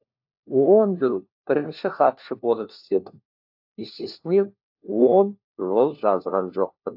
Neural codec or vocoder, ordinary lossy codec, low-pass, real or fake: codec, 16 kHz, 1 kbps, FunCodec, trained on LibriTTS, 50 frames a second; AAC, 48 kbps; 5.4 kHz; fake